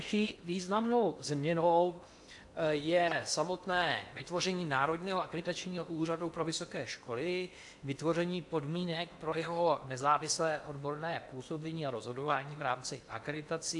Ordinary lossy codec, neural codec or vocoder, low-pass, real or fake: AAC, 64 kbps; codec, 16 kHz in and 24 kHz out, 0.8 kbps, FocalCodec, streaming, 65536 codes; 10.8 kHz; fake